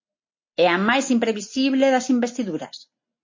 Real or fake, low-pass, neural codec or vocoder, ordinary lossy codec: real; 7.2 kHz; none; MP3, 32 kbps